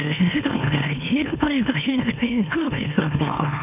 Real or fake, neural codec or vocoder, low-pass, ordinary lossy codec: fake; autoencoder, 44.1 kHz, a latent of 192 numbers a frame, MeloTTS; 3.6 kHz; none